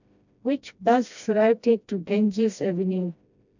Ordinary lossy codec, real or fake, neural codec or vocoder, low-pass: none; fake; codec, 16 kHz, 1 kbps, FreqCodec, smaller model; 7.2 kHz